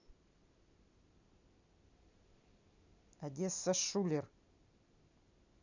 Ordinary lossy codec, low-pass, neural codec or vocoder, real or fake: none; 7.2 kHz; none; real